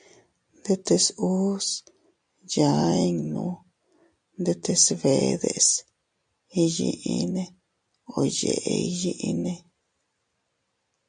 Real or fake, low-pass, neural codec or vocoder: real; 9.9 kHz; none